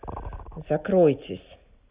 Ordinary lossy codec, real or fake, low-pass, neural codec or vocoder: Opus, 64 kbps; real; 3.6 kHz; none